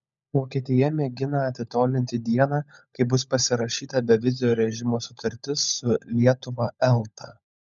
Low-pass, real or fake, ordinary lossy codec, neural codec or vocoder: 7.2 kHz; fake; MP3, 96 kbps; codec, 16 kHz, 16 kbps, FunCodec, trained on LibriTTS, 50 frames a second